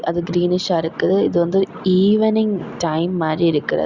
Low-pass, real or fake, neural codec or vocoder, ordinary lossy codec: 7.2 kHz; real; none; Opus, 64 kbps